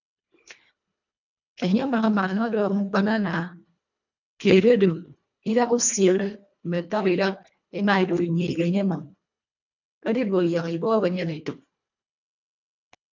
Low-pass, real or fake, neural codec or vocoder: 7.2 kHz; fake; codec, 24 kHz, 1.5 kbps, HILCodec